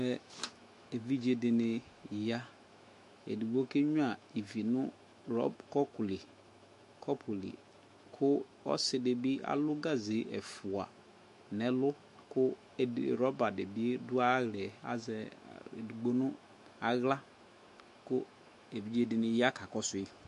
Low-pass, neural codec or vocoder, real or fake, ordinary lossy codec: 14.4 kHz; autoencoder, 48 kHz, 128 numbers a frame, DAC-VAE, trained on Japanese speech; fake; MP3, 48 kbps